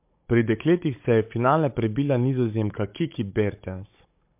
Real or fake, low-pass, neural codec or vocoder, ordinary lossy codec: fake; 3.6 kHz; codec, 16 kHz, 16 kbps, FunCodec, trained on Chinese and English, 50 frames a second; MP3, 32 kbps